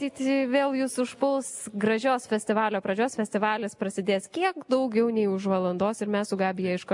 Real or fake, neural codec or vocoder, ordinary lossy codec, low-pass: real; none; MP3, 64 kbps; 10.8 kHz